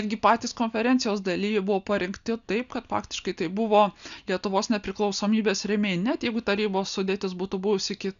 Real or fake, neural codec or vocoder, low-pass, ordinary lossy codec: real; none; 7.2 kHz; MP3, 96 kbps